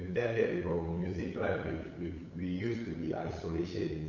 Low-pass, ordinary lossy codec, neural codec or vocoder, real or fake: 7.2 kHz; none; codec, 16 kHz, 8 kbps, FunCodec, trained on LibriTTS, 25 frames a second; fake